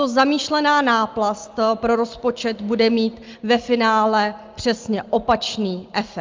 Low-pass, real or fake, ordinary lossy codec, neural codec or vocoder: 7.2 kHz; real; Opus, 24 kbps; none